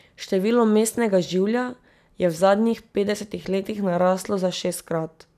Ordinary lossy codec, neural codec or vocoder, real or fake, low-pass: none; none; real; 14.4 kHz